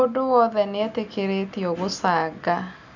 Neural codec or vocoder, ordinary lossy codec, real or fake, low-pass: none; none; real; 7.2 kHz